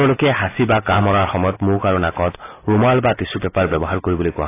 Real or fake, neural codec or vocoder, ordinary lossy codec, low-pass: real; none; AAC, 24 kbps; 3.6 kHz